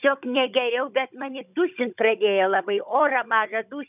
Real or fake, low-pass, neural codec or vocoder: fake; 3.6 kHz; codec, 16 kHz, 16 kbps, FunCodec, trained on Chinese and English, 50 frames a second